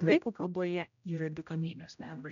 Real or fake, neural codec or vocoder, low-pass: fake; codec, 16 kHz, 0.5 kbps, X-Codec, HuBERT features, trained on general audio; 7.2 kHz